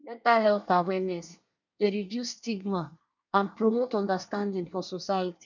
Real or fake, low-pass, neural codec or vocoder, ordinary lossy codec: fake; 7.2 kHz; codec, 24 kHz, 1 kbps, SNAC; none